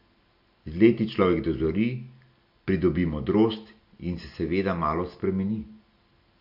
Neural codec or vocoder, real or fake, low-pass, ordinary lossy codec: none; real; 5.4 kHz; MP3, 48 kbps